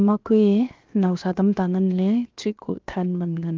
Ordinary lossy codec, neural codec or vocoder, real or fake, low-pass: Opus, 32 kbps; codec, 16 kHz, 0.7 kbps, FocalCodec; fake; 7.2 kHz